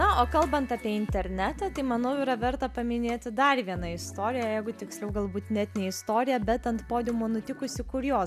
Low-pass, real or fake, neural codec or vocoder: 14.4 kHz; real; none